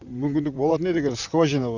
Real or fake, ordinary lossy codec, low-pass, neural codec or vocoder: fake; none; 7.2 kHz; vocoder, 44.1 kHz, 128 mel bands, Pupu-Vocoder